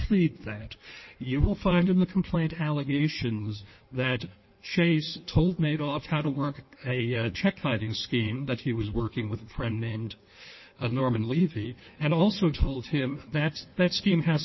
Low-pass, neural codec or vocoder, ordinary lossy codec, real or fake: 7.2 kHz; codec, 16 kHz in and 24 kHz out, 1.1 kbps, FireRedTTS-2 codec; MP3, 24 kbps; fake